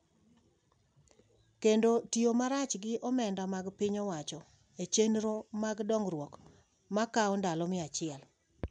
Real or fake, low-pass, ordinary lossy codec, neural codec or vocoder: real; 9.9 kHz; none; none